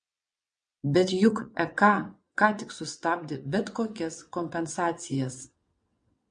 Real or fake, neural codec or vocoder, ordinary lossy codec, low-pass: fake; vocoder, 22.05 kHz, 80 mel bands, WaveNeXt; MP3, 48 kbps; 9.9 kHz